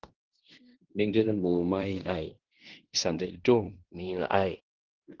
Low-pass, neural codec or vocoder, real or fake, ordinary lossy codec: 7.2 kHz; codec, 16 kHz in and 24 kHz out, 0.9 kbps, LongCat-Audio-Codec, fine tuned four codebook decoder; fake; Opus, 16 kbps